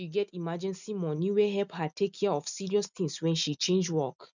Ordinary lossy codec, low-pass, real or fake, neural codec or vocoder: none; 7.2 kHz; real; none